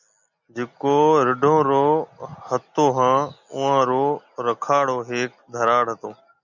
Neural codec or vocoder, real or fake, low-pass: none; real; 7.2 kHz